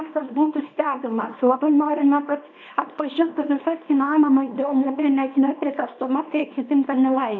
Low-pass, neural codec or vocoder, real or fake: 7.2 kHz; codec, 24 kHz, 0.9 kbps, WavTokenizer, small release; fake